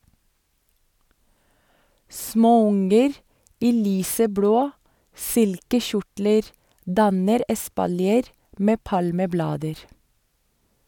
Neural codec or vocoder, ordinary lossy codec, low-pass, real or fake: none; none; 19.8 kHz; real